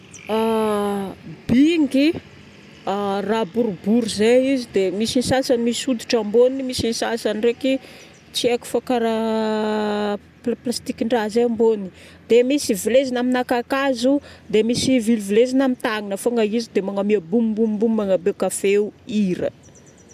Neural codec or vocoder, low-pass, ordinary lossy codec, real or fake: none; 14.4 kHz; none; real